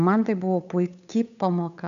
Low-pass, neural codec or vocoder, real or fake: 7.2 kHz; codec, 16 kHz, 8 kbps, FunCodec, trained on Chinese and English, 25 frames a second; fake